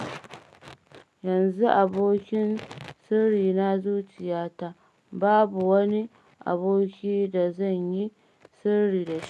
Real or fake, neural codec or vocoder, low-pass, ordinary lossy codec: real; none; none; none